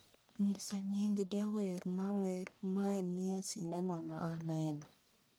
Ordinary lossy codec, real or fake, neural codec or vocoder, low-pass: none; fake; codec, 44.1 kHz, 1.7 kbps, Pupu-Codec; none